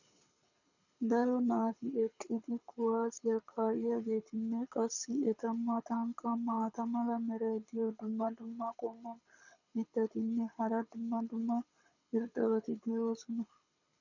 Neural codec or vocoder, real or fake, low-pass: codec, 24 kHz, 6 kbps, HILCodec; fake; 7.2 kHz